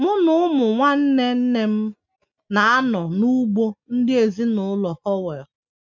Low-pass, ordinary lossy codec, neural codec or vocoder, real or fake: 7.2 kHz; none; none; real